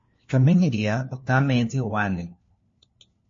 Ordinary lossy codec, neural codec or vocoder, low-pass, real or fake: MP3, 32 kbps; codec, 16 kHz, 1 kbps, FunCodec, trained on LibriTTS, 50 frames a second; 7.2 kHz; fake